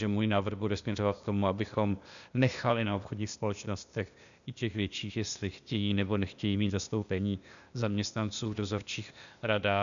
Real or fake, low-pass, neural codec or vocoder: fake; 7.2 kHz; codec, 16 kHz, 0.8 kbps, ZipCodec